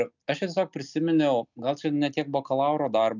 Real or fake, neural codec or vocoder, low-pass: real; none; 7.2 kHz